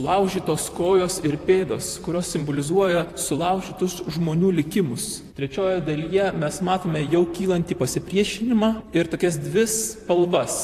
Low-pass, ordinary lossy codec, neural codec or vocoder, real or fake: 14.4 kHz; AAC, 64 kbps; vocoder, 44.1 kHz, 128 mel bands, Pupu-Vocoder; fake